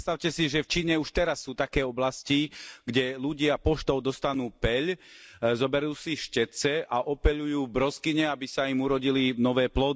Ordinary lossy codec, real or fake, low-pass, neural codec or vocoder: none; real; none; none